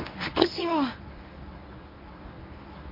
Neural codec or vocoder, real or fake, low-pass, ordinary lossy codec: codec, 24 kHz, 0.9 kbps, WavTokenizer, medium speech release version 1; fake; 5.4 kHz; MP3, 32 kbps